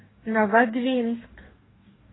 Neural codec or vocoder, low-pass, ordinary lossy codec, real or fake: codec, 44.1 kHz, 2.6 kbps, DAC; 7.2 kHz; AAC, 16 kbps; fake